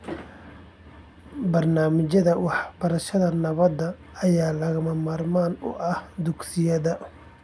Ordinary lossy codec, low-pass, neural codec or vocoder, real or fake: none; none; none; real